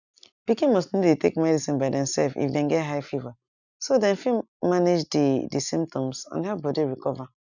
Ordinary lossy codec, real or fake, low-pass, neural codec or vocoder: none; real; 7.2 kHz; none